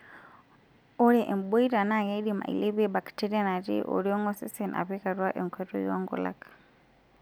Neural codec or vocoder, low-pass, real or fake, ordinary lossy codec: none; none; real; none